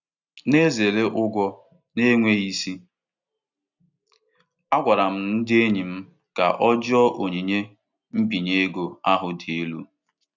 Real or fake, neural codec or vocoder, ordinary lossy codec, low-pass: real; none; none; 7.2 kHz